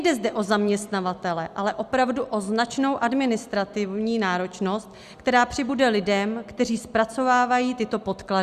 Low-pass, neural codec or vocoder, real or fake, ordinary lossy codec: 14.4 kHz; none; real; Opus, 64 kbps